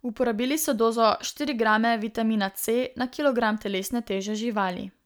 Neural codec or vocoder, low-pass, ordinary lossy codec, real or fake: none; none; none; real